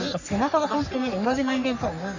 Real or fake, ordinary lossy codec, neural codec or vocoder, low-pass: fake; none; codec, 44.1 kHz, 3.4 kbps, Pupu-Codec; 7.2 kHz